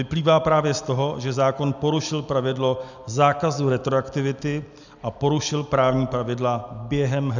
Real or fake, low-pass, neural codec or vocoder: real; 7.2 kHz; none